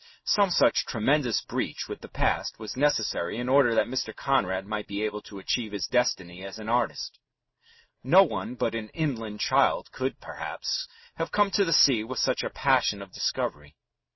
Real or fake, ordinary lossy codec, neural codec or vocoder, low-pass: real; MP3, 24 kbps; none; 7.2 kHz